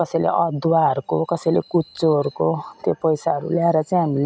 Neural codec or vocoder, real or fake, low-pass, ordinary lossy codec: none; real; none; none